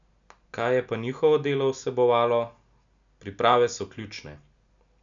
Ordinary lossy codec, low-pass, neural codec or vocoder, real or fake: none; 7.2 kHz; none; real